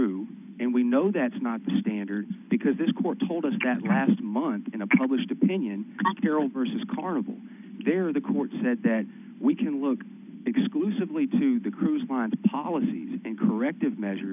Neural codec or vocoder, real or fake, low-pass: none; real; 3.6 kHz